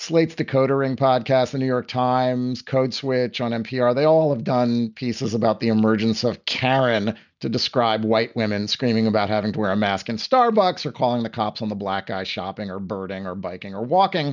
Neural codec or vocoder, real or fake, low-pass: none; real; 7.2 kHz